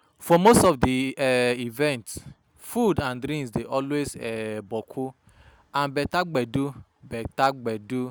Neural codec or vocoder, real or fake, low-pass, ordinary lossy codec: none; real; none; none